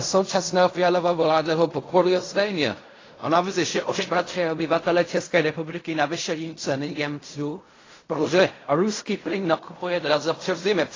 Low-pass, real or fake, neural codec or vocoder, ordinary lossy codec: 7.2 kHz; fake; codec, 16 kHz in and 24 kHz out, 0.4 kbps, LongCat-Audio-Codec, fine tuned four codebook decoder; AAC, 32 kbps